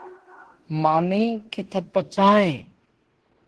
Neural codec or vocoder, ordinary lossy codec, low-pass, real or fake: codec, 16 kHz in and 24 kHz out, 0.9 kbps, LongCat-Audio-Codec, fine tuned four codebook decoder; Opus, 16 kbps; 10.8 kHz; fake